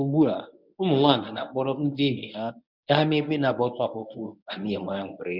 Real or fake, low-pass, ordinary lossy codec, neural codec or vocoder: fake; 5.4 kHz; none; codec, 24 kHz, 0.9 kbps, WavTokenizer, medium speech release version 1